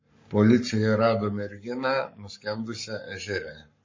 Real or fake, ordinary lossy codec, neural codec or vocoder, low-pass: fake; MP3, 32 kbps; codec, 44.1 kHz, 7.8 kbps, Pupu-Codec; 7.2 kHz